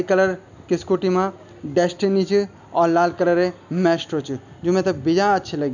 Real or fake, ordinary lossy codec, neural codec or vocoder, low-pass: real; none; none; 7.2 kHz